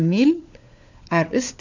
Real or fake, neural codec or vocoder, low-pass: fake; codec, 16 kHz, 4 kbps, FunCodec, trained on LibriTTS, 50 frames a second; 7.2 kHz